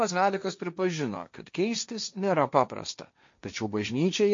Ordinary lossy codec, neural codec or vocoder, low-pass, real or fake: MP3, 48 kbps; codec, 16 kHz, 1.1 kbps, Voila-Tokenizer; 7.2 kHz; fake